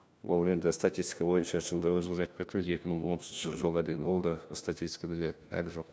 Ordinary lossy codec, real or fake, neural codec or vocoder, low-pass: none; fake; codec, 16 kHz, 1 kbps, FunCodec, trained on LibriTTS, 50 frames a second; none